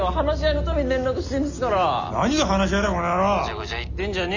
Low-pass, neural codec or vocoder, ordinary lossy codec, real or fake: 7.2 kHz; none; none; real